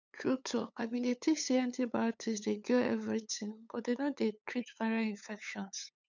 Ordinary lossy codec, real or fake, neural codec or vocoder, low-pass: none; fake; codec, 16 kHz, 8 kbps, FunCodec, trained on LibriTTS, 25 frames a second; 7.2 kHz